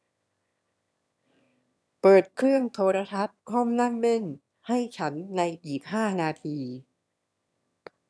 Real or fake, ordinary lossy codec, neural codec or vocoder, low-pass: fake; none; autoencoder, 22.05 kHz, a latent of 192 numbers a frame, VITS, trained on one speaker; none